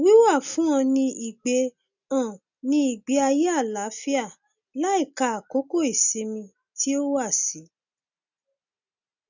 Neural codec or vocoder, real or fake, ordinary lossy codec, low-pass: none; real; none; 7.2 kHz